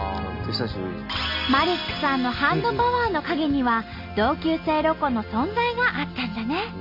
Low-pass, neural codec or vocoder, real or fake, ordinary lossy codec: 5.4 kHz; none; real; none